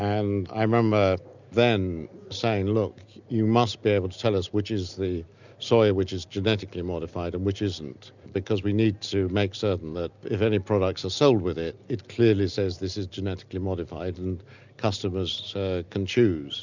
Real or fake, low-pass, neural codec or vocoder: real; 7.2 kHz; none